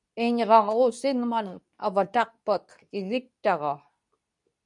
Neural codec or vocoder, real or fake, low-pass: codec, 24 kHz, 0.9 kbps, WavTokenizer, medium speech release version 2; fake; 10.8 kHz